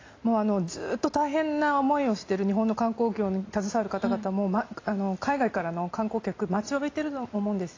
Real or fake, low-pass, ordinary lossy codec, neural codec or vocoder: real; 7.2 kHz; AAC, 32 kbps; none